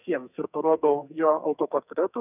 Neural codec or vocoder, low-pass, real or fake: codec, 44.1 kHz, 2.6 kbps, SNAC; 3.6 kHz; fake